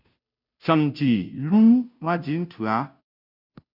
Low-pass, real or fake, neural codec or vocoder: 5.4 kHz; fake; codec, 16 kHz, 0.5 kbps, FunCodec, trained on Chinese and English, 25 frames a second